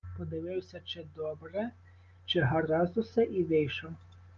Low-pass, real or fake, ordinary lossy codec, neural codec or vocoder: 7.2 kHz; real; Opus, 32 kbps; none